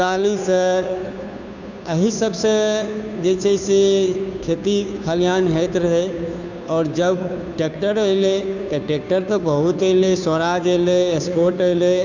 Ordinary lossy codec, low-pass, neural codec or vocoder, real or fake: none; 7.2 kHz; codec, 16 kHz, 2 kbps, FunCodec, trained on Chinese and English, 25 frames a second; fake